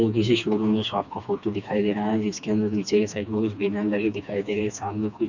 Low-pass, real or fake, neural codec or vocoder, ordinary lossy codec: 7.2 kHz; fake; codec, 16 kHz, 2 kbps, FreqCodec, smaller model; none